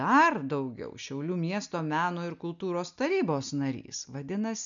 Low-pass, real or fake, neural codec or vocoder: 7.2 kHz; real; none